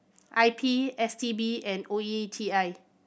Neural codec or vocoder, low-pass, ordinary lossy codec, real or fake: none; none; none; real